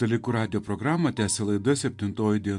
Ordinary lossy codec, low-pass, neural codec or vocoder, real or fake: MP3, 64 kbps; 10.8 kHz; none; real